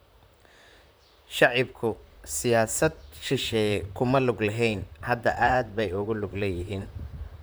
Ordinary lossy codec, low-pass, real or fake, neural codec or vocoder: none; none; fake; vocoder, 44.1 kHz, 128 mel bands, Pupu-Vocoder